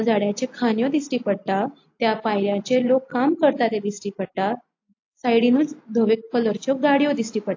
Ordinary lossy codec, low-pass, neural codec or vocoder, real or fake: AAC, 48 kbps; 7.2 kHz; none; real